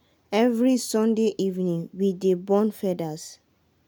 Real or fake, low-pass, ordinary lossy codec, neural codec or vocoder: real; none; none; none